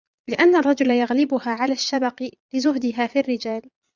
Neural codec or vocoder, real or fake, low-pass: vocoder, 22.05 kHz, 80 mel bands, Vocos; fake; 7.2 kHz